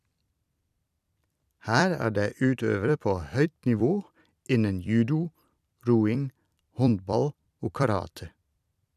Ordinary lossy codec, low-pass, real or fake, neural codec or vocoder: none; 14.4 kHz; fake; vocoder, 44.1 kHz, 128 mel bands every 256 samples, BigVGAN v2